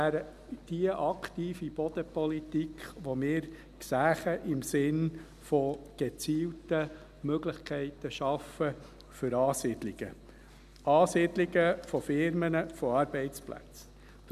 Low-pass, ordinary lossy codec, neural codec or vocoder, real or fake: 14.4 kHz; none; none; real